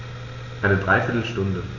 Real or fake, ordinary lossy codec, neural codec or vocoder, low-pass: real; none; none; 7.2 kHz